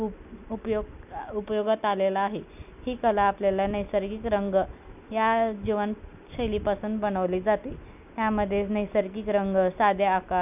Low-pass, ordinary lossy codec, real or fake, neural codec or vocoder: 3.6 kHz; none; real; none